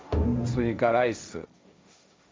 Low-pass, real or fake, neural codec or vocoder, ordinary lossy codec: none; fake; codec, 16 kHz, 1.1 kbps, Voila-Tokenizer; none